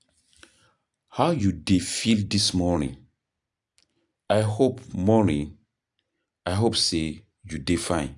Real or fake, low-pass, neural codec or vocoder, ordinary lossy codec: fake; 10.8 kHz; vocoder, 44.1 kHz, 128 mel bands every 256 samples, BigVGAN v2; none